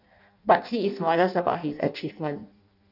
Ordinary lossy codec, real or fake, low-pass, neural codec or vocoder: none; fake; 5.4 kHz; codec, 16 kHz in and 24 kHz out, 0.6 kbps, FireRedTTS-2 codec